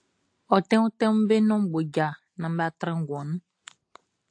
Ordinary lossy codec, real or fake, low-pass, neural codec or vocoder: AAC, 48 kbps; real; 9.9 kHz; none